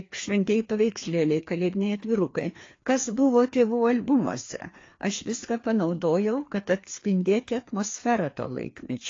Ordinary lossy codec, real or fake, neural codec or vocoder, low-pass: AAC, 32 kbps; fake; codec, 16 kHz, 2 kbps, FreqCodec, larger model; 7.2 kHz